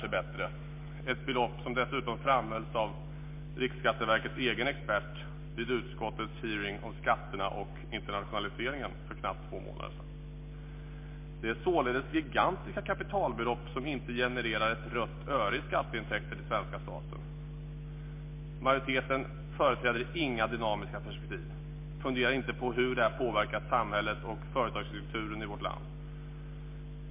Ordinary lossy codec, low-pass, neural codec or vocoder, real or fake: MP3, 24 kbps; 3.6 kHz; none; real